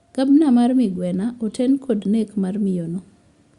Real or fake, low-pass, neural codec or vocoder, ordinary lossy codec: real; 10.8 kHz; none; Opus, 64 kbps